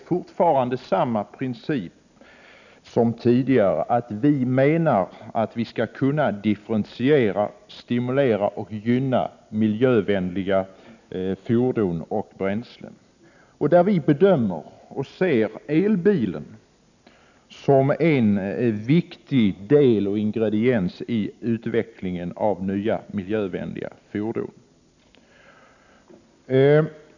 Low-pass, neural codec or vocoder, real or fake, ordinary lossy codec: 7.2 kHz; none; real; none